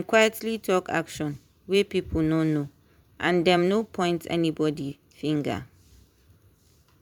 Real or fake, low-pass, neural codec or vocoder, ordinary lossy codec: fake; 19.8 kHz; vocoder, 44.1 kHz, 128 mel bands every 512 samples, BigVGAN v2; MP3, 96 kbps